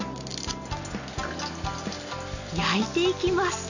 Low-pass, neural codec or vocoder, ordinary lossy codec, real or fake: 7.2 kHz; none; none; real